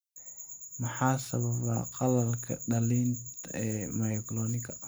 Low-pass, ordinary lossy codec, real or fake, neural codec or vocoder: none; none; real; none